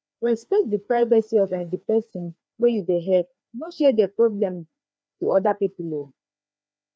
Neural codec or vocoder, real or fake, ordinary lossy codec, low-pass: codec, 16 kHz, 2 kbps, FreqCodec, larger model; fake; none; none